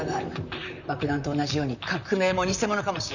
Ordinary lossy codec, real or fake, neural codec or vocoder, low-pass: none; fake; vocoder, 44.1 kHz, 128 mel bands, Pupu-Vocoder; 7.2 kHz